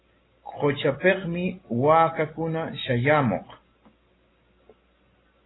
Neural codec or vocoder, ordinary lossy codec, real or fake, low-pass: none; AAC, 16 kbps; real; 7.2 kHz